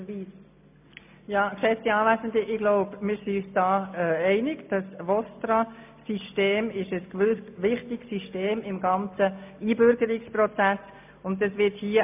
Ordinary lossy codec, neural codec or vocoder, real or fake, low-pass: MP3, 32 kbps; none; real; 3.6 kHz